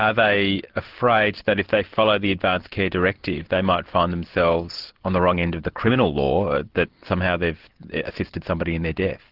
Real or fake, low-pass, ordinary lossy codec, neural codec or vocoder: real; 5.4 kHz; Opus, 16 kbps; none